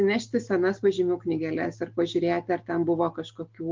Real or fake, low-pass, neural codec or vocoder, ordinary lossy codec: real; 7.2 kHz; none; Opus, 32 kbps